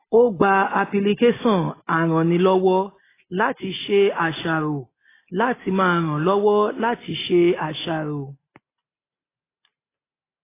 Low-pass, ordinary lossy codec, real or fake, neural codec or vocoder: 3.6 kHz; AAC, 16 kbps; real; none